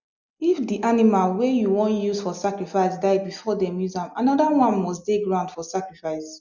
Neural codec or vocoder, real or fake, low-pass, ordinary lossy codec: none; real; 7.2 kHz; none